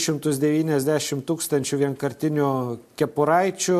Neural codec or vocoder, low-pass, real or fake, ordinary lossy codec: none; 14.4 kHz; real; MP3, 64 kbps